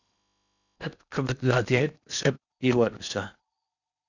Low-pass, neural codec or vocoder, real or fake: 7.2 kHz; codec, 16 kHz in and 24 kHz out, 0.8 kbps, FocalCodec, streaming, 65536 codes; fake